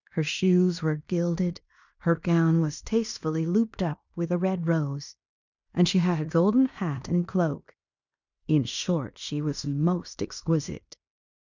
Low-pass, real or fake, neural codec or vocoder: 7.2 kHz; fake; codec, 16 kHz in and 24 kHz out, 0.9 kbps, LongCat-Audio-Codec, fine tuned four codebook decoder